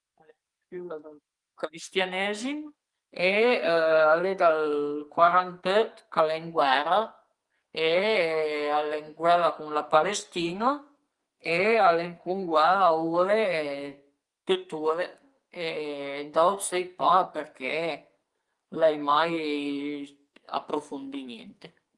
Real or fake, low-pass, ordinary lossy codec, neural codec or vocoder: fake; 10.8 kHz; Opus, 32 kbps; codec, 44.1 kHz, 2.6 kbps, SNAC